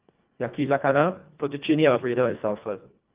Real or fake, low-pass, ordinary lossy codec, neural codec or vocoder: fake; 3.6 kHz; Opus, 32 kbps; codec, 24 kHz, 1.5 kbps, HILCodec